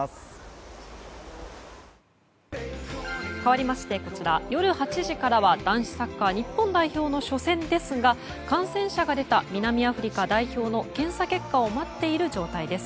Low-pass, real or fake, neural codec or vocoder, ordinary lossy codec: none; real; none; none